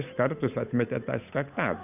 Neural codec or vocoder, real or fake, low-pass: codec, 16 kHz, 8 kbps, FunCodec, trained on Chinese and English, 25 frames a second; fake; 3.6 kHz